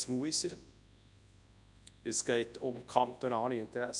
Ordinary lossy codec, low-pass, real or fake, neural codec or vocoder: none; 10.8 kHz; fake; codec, 24 kHz, 0.9 kbps, WavTokenizer, large speech release